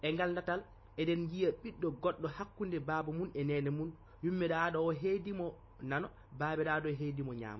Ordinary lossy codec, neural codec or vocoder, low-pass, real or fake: MP3, 24 kbps; none; 7.2 kHz; real